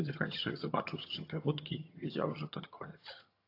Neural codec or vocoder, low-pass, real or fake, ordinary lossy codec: vocoder, 22.05 kHz, 80 mel bands, HiFi-GAN; 5.4 kHz; fake; AAC, 32 kbps